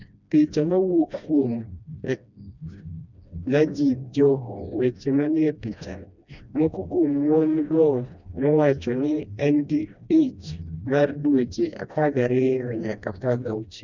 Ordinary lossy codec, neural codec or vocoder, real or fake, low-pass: none; codec, 16 kHz, 1 kbps, FreqCodec, smaller model; fake; 7.2 kHz